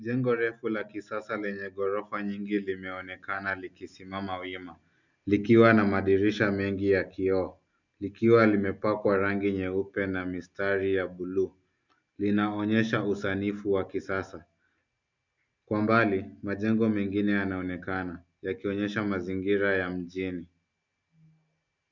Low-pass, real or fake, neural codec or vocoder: 7.2 kHz; real; none